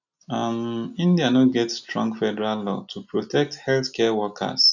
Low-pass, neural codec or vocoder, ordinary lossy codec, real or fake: 7.2 kHz; none; none; real